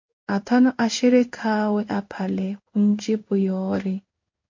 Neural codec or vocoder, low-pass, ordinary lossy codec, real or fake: codec, 16 kHz in and 24 kHz out, 1 kbps, XY-Tokenizer; 7.2 kHz; MP3, 48 kbps; fake